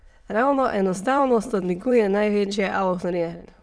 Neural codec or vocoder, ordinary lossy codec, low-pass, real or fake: autoencoder, 22.05 kHz, a latent of 192 numbers a frame, VITS, trained on many speakers; none; none; fake